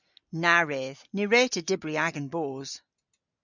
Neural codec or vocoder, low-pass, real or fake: none; 7.2 kHz; real